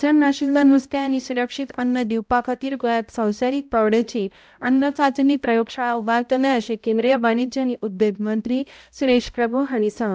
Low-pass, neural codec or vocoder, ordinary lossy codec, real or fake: none; codec, 16 kHz, 0.5 kbps, X-Codec, HuBERT features, trained on balanced general audio; none; fake